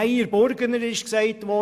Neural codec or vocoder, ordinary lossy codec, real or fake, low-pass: none; none; real; 14.4 kHz